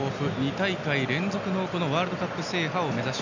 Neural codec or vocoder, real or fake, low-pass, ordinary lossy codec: none; real; 7.2 kHz; none